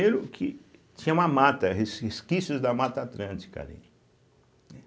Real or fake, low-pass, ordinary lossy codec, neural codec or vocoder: real; none; none; none